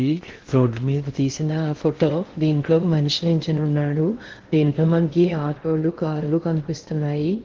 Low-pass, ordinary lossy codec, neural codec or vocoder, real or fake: 7.2 kHz; Opus, 16 kbps; codec, 16 kHz in and 24 kHz out, 0.6 kbps, FocalCodec, streaming, 2048 codes; fake